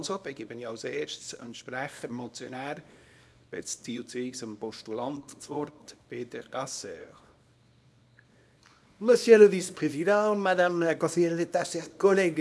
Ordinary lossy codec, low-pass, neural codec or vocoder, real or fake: none; none; codec, 24 kHz, 0.9 kbps, WavTokenizer, small release; fake